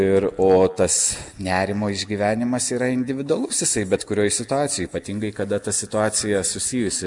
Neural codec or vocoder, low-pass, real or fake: none; 10.8 kHz; real